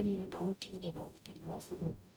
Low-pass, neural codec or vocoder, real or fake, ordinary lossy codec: none; codec, 44.1 kHz, 0.9 kbps, DAC; fake; none